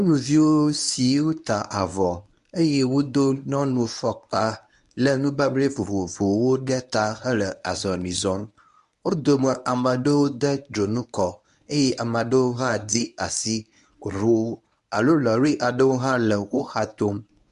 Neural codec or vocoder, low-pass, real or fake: codec, 24 kHz, 0.9 kbps, WavTokenizer, medium speech release version 1; 10.8 kHz; fake